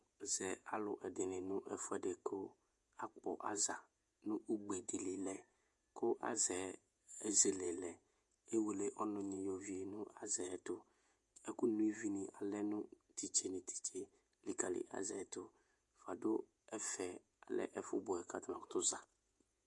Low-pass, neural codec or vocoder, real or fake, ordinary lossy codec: 10.8 kHz; vocoder, 48 kHz, 128 mel bands, Vocos; fake; MP3, 64 kbps